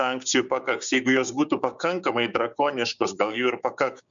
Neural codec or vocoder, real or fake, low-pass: codec, 16 kHz, 6 kbps, DAC; fake; 7.2 kHz